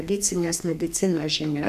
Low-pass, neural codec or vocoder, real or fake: 14.4 kHz; codec, 32 kHz, 1.9 kbps, SNAC; fake